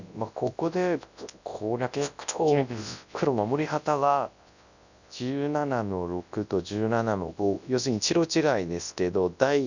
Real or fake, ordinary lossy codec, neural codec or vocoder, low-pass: fake; none; codec, 24 kHz, 0.9 kbps, WavTokenizer, large speech release; 7.2 kHz